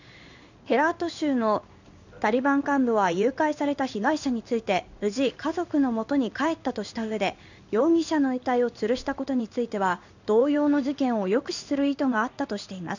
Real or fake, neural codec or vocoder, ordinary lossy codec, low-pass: fake; codec, 16 kHz in and 24 kHz out, 1 kbps, XY-Tokenizer; none; 7.2 kHz